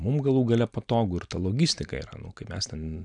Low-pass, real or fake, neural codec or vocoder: 9.9 kHz; real; none